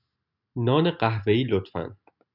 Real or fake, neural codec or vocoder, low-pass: real; none; 5.4 kHz